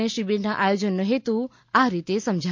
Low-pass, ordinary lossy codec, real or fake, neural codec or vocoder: 7.2 kHz; MP3, 48 kbps; real; none